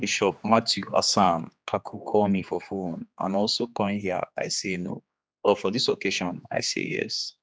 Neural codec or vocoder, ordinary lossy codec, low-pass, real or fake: codec, 16 kHz, 2 kbps, X-Codec, HuBERT features, trained on general audio; none; none; fake